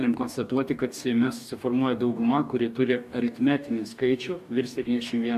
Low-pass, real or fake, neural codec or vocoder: 14.4 kHz; fake; codec, 44.1 kHz, 2.6 kbps, DAC